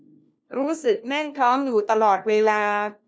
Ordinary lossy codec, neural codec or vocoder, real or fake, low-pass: none; codec, 16 kHz, 1 kbps, FunCodec, trained on LibriTTS, 50 frames a second; fake; none